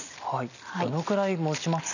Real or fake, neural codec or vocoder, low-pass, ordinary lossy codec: real; none; 7.2 kHz; none